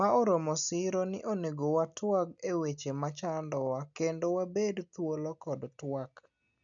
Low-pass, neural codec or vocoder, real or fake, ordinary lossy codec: 7.2 kHz; none; real; none